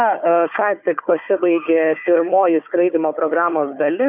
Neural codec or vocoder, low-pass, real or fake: codec, 16 kHz, 4 kbps, FunCodec, trained on Chinese and English, 50 frames a second; 3.6 kHz; fake